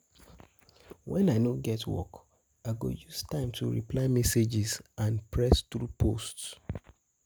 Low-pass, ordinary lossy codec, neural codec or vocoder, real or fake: none; none; none; real